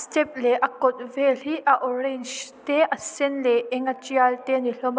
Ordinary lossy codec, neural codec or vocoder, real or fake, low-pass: none; none; real; none